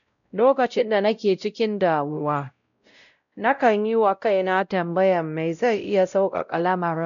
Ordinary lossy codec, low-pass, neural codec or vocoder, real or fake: none; 7.2 kHz; codec, 16 kHz, 0.5 kbps, X-Codec, WavLM features, trained on Multilingual LibriSpeech; fake